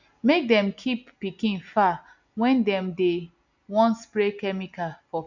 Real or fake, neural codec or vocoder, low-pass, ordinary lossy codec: real; none; 7.2 kHz; none